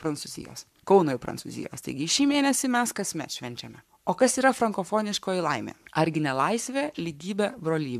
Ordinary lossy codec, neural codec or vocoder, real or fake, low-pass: MP3, 96 kbps; codec, 44.1 kHz, 7.8 kbps, Pupu-Codec; fake; 14.4 kHz